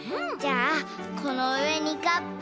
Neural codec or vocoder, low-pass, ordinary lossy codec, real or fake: none; none; none; real